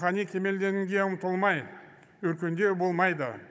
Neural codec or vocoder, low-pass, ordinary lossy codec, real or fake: codec, 16 kHz, 16 kbps, FunCodec, trained on Chinese and English, 50 frames a second; none; none; fake